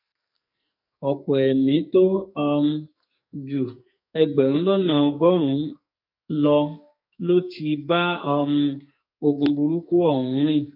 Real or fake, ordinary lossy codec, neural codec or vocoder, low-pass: fake; MP3, 48 kbps; codec, 44.1 kHz, 2.6 kbps, SNAC; 5.4 kHz